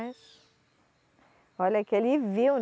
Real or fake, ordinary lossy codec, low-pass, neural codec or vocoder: real; none; none; none